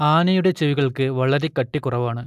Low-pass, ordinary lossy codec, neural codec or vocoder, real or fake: 14.4 kHz; AAC, 96 kbps; none; real